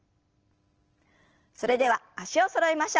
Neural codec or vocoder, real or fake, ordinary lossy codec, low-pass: none; real; Opus, 16 kbps; 7.2 kHz